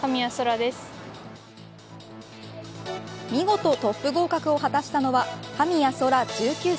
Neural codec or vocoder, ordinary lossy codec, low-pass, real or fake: none; none; none; real